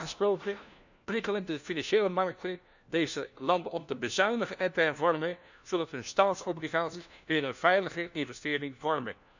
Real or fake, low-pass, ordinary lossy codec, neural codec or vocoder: fake; 7.2 kHz; none; codec, 16 kHz, 1 kbps, FunCodec, trained on LibriTTS, 50 frames a second